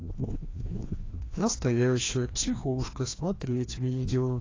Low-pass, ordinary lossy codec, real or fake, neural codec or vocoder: 7.2 kHz; AAC, 32 kbps; fake; codec, 16 kHz, 1 kbps, FreqCodec, larger model